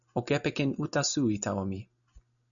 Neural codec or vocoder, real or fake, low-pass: none; real; 7.2 kHz